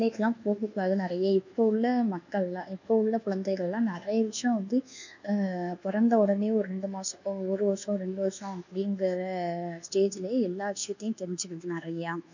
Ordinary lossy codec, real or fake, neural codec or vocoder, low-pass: none; fake; codec, 24 kHz, 1.2 kbps, DualCodec; 7.2 kHz